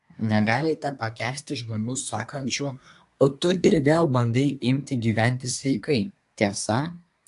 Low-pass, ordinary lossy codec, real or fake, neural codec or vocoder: 10.8 kHz; AAC, 64 kbps; fake; codec, 24 kHz, 1 kbps, SNAC